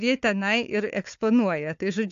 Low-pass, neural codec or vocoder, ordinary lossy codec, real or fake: 7.2 kHz; codec, 16 kHz, 4 kbps, FunCodec, trained on Chinese and English, 50 frames a second; AAC, 64 kbps; fake